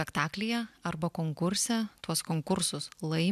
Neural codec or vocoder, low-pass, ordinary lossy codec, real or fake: none; 14.4 kHz; AAC, 96 kbps; real